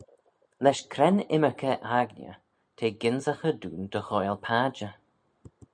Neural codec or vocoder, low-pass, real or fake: none; 9.9 kHz; real